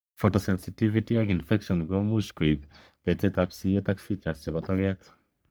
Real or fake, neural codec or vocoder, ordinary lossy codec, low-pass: fake; codec, 44.1 kHz, 3.4 kbps, Pupu-Codec; none; none